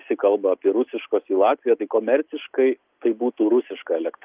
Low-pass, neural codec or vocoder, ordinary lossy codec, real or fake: 3.6 kHz; none; Opus, 24 kbps; real